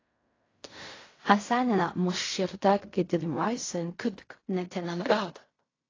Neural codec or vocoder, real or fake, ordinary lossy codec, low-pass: codec, 16 kHz in and 24 kHz out, 0.4 kbps, LongCat-Audio-Codec, fine tuned four codebook decoder; fake; AAC, 32 kbps; 7.2 kHz